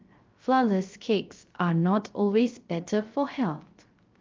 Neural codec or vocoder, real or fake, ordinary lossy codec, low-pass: codec, 16 kHz, 0.3 kbps, FocalCodec; fake; Opus, 32 kbps; 7.2 kHz